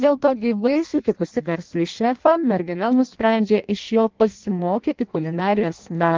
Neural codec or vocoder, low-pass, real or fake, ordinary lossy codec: codec, 16 kHz in and 24 kHz out, 0.6 kbps, FireRedTTS-2 codec; 7.2 kHz; fake; Opus, 32 kbps